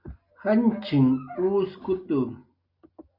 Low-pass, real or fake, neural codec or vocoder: 5.4 kHz; real; none